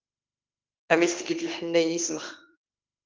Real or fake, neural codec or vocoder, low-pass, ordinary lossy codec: fake; autoencoder, 48 kHz, 32 numbers a frame, DAC-VAE, trained on Japanese speech; 7.2 kHz; Opus, 16 kbps